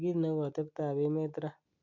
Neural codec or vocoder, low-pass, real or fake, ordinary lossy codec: none; 7.2 kHz; real; none